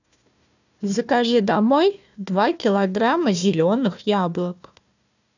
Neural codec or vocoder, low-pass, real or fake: codec, 16 kHz, 1 kbps, FunCodec, trained on Chinese and English, 50 frames a second; 7.2 kHz; fake